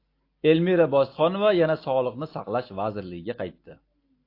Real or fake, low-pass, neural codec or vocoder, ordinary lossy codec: real; 5.4 kHz; none; AAC, 32 kbps